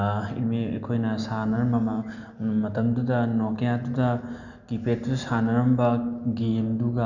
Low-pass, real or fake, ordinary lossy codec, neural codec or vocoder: 7.2 kHz; real; none; none